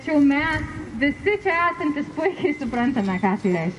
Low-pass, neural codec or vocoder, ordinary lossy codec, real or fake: 14.4 kHz; none; MP3, 48 kbps; real